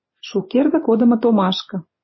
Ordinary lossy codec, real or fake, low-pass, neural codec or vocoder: MP3, 24 kbps; fake; 7.2 kHz; vocoder, 44.1 kHz, 128 mel bands every 256 samples, BigVGAN v2